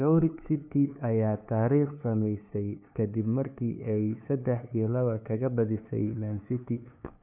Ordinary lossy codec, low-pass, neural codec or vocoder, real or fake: none; 3.6 kHz; codec, 16 kHz, 2 kbps, FunCodec, trained on LibriTTS, 25 frames a second; fake